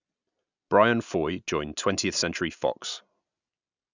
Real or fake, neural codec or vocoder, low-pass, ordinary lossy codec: real; none; 7.2 kHz; none